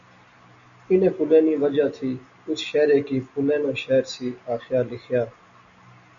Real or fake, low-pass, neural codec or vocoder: real; 7.2 kHz; none